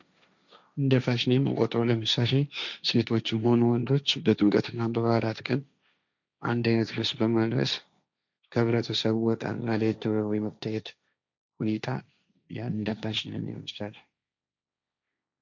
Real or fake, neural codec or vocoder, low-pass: fake; codec, 16 kHz, 1.1 kbps, Voila-Tokenizer; 7.2 kHz